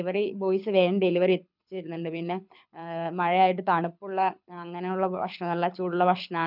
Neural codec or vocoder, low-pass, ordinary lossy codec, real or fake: codec, 24 kHz, 6 kbps, HILCodec; 5.4 kHz; none; fake